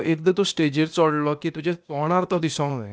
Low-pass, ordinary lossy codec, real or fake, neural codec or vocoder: none; none; fake; codec, 16 kHz, 0.8 kbps, ZipCodec